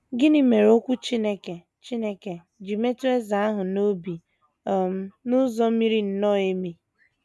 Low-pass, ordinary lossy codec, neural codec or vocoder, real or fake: none; none; none; real